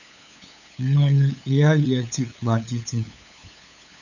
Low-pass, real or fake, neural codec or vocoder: 7.2 kHz; fake; codec, 16 kHz, 8 kbps, FunCodec, trained on LibriTTS, 25 frames a second